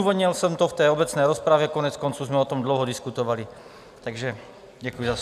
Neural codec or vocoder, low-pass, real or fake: none; 14.4 kHz; real